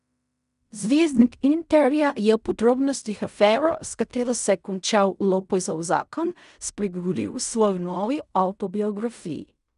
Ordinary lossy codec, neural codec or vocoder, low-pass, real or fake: none; codec, 16 kHz in and 24 kHz out, 0.4 kbps, LongCat-Audio-Codec, fine tuned four codebook decoder; 10.8 kHz; fake